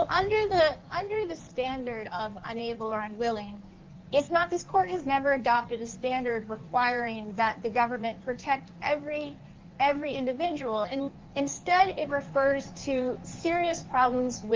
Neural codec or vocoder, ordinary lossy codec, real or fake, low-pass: codec, 16 kHz in and 24 kHz out, 1.1 kbps, FireRedTTS-2 codec; Opus, 32 kbps; fake; 7.2 kHz